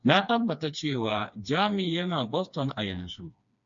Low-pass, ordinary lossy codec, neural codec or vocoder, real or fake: 7.2 kHz; MP3, 64 kbps; codec, 16 kHz, 2 kbps, FreqCodec, smaller model; fake